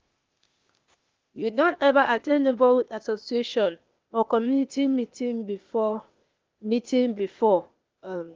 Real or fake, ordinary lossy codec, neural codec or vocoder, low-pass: fake; Opus, 24 kbps; codec, 16 kHz, 0.8 kbps, ZipCodec; 7.2 kHz